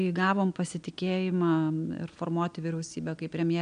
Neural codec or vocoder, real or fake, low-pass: none; real; 9.9 kHz